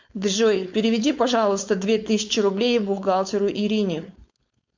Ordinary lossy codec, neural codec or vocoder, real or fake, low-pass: MP3, 64 kbps; codec, 16 kHz, 4.8 kbps, FACodec; fake; 7.2 kHz